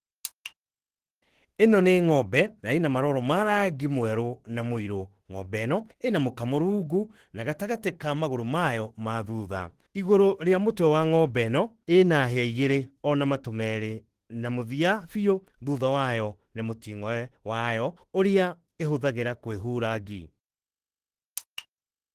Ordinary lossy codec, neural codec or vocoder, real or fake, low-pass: Opus, 16 kbps; autoencoder, 48 kHz, 32 numbers a frame, DAC-VAE, trained on Japanese speech; fake; 14.4 kHz